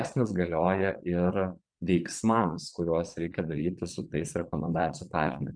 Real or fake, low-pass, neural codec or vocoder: fake; 9.9 kHz; vocoder, 22.05 kHz, 80 mel bands, Vocos